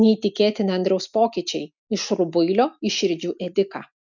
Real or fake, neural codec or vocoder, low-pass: real; none; 7.2 kHz